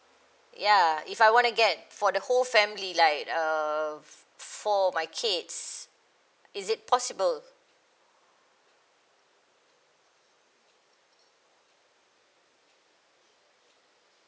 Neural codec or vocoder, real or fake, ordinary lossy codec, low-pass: none; real; none; none